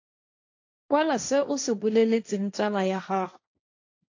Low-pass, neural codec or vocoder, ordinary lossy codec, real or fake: 7.2 kHz; codec, 16 kHz, 1.1 kbps, Voila-Tokenizer; AAC, 48 kbps; fake